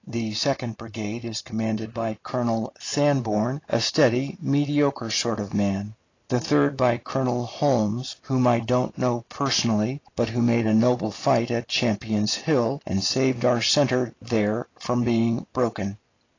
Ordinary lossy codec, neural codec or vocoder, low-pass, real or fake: AAC, 32 kbps; none; 7.2 kHz; real